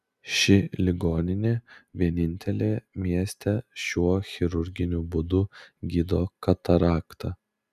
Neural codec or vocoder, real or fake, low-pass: none; real; 14.4 kHz